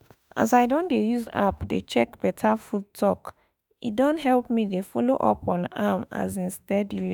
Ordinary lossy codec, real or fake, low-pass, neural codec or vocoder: none; fake; none; autoencoder, 48 kHz, 32 numbers a frame, DAC-VAE, trained on Japanese speech